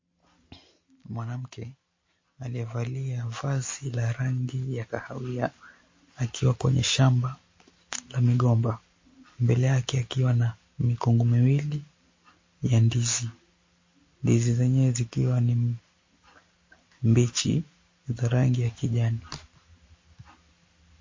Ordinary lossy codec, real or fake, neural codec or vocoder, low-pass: MP3, 32 kbps; real; none; 7.2 kHz